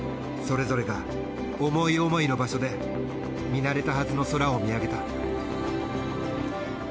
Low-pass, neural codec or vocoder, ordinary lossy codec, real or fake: none; none; none; real